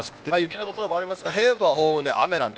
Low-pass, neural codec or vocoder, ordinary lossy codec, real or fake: none; codec, 16 kHz, 0.8 kbps, ZipCodec; none; fake